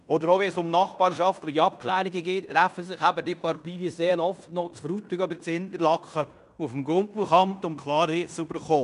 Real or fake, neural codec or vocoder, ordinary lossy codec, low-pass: fake; codec, 16 kHz in and 24 kHz out, 0.9 kbps, LongCat-Audio-Codec, fine tuned four codebook decoder; none; 10.8 kHz